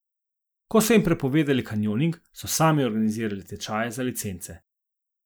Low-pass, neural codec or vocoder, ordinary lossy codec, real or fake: none; none; none; real